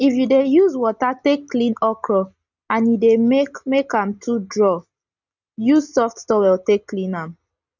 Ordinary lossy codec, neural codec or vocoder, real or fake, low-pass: none; none; real; 7.2 kHz